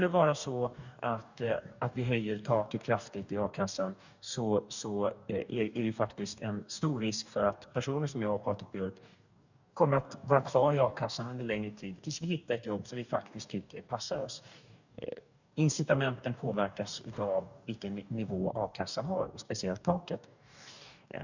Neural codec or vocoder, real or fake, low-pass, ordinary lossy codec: codec, 44.1 kHz, 2.6 kbps, DAC; fake; 7.2 kHz; none